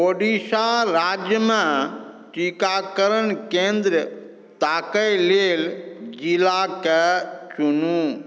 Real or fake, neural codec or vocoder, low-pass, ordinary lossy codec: real; none; none; none